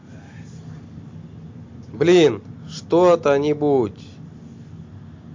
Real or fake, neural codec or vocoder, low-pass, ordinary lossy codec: real; none; 7.2 kHz; MP3, 48 kbps